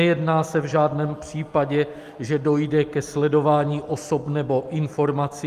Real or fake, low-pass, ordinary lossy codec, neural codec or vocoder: real; 14.4 kHz; Opus, 32 kbps; none